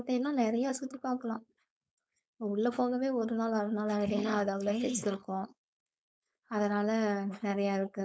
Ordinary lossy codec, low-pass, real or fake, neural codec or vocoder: none; none; fake; codec, 16 kHz, 4.8 kbps, FACodec